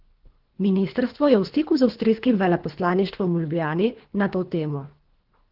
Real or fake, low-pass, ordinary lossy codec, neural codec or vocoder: fake; 5.4 kHz; Opus, 16 kbps; codec, 24 kHz, 3 kbps, HILCodec